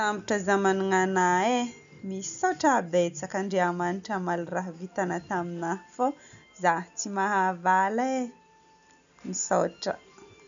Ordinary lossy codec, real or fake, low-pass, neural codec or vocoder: none; real; 7.2 kHz; none